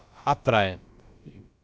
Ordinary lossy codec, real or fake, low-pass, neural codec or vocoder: none; fake; none; codec, 16 kHz, about 1 kbps, DyCAST, with the encoder's durations